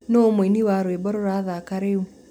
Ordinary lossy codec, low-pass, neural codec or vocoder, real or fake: none; 19.8 kHz; none; real